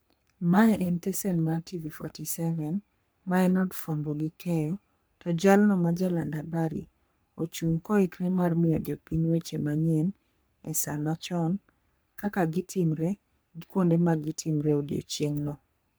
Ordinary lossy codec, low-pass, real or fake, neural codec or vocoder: none; none; fake; codec, 44.1 kHz, 3.4 kbps, Pupu-Codec